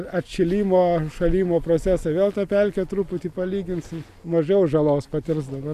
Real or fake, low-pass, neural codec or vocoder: real; 14.4 kHz; none